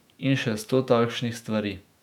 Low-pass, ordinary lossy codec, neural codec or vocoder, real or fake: 19.8 kHz; none; autoencoder, 48 kHz, 128 numbers a frame, DAC-VAE, trained on Japanese speech; fake